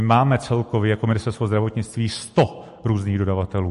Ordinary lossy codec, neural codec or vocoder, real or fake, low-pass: MP3, 48 kbps; none; real; 14.4 kHz